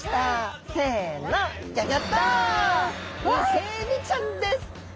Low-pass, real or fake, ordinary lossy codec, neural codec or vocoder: none; real; none; none